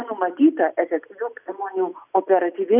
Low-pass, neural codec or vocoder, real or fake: 3.6 kHz; none; real